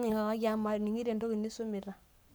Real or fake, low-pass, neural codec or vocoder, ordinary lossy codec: fake; none; codec, 44.1 kHz, 7.8 kbps, Pupu-Codec; none